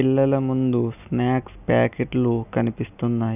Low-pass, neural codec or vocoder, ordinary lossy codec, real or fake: 3.6 kHz; none; none; real